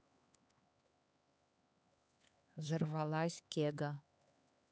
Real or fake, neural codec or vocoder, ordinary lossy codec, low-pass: fake; codec, 16 kHz, 4 kbps, X-Codec, HuBERT features, trained on LibriSpeech; none; none